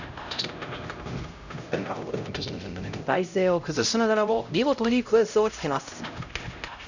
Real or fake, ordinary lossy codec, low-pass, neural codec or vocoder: fake; none; 7.2 kHz; codec, 16 kHz, 0.5 kbps, X-Codec, HuBERT features, trained on LibriSpeech